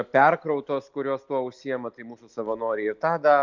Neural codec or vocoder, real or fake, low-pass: none; real; 7.2 kHz